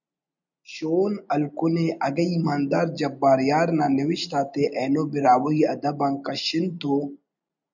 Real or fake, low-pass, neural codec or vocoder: real; 7.2 kHz; none